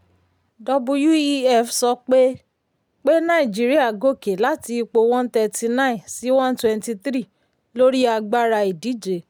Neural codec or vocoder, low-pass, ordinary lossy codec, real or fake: none; none; none; real